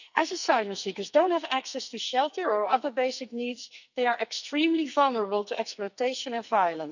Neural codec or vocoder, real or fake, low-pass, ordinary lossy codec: codec, 44.1 kHz, 2.6 kbps, SNAC; fake; 7.2 kHz; none